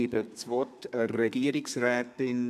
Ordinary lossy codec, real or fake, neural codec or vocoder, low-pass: none; fake; codec, 32 kHz, 1.9 kbps, SNAC; 14.4 kHz